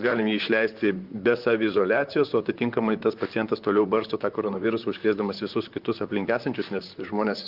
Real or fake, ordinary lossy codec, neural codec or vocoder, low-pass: fake; Opus, 24 kbps; vocoder, 44.1 kHz, 128 mel bands, Pupu-Vocoder; 5.4 kHz